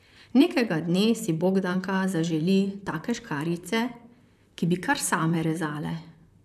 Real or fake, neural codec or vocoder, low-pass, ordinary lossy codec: fake; vocoder, 44.1 kHz, 128 mel bands, Pupu-Vocoder; 14.4 kHz; none